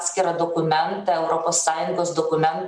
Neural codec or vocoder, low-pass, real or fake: none; 9.9 kHz; real